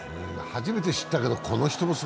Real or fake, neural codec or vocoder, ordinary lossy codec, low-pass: real; none; none; none